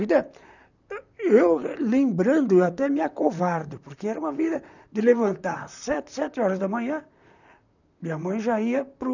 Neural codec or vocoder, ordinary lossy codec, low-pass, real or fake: vocoder, 44.1 kHz, 128 mel bands, Pupu-Vocoder; none; 7.2 kHz; fake